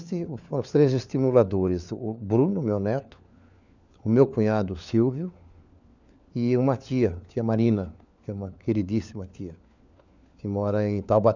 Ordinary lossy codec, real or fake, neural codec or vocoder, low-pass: none; fake; codec, 16 kHz, 4 kbps, FunCodec, trained on LibriTTS, 50 frames a second; 7.2 kHz